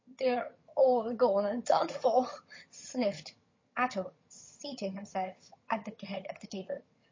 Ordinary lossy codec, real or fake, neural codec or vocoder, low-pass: MP3, 32 kbps; fake; vocoder, 22.05 kHz, 80 mel bands, HiFi-GAN; 7.2 kHz